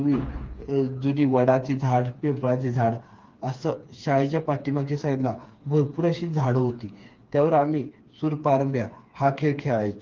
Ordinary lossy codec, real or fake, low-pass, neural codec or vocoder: Opus, 32 kbps; fake; 7.2 kHz; codec, 16 kHz, 4 kbps, FreqCodec, smaller model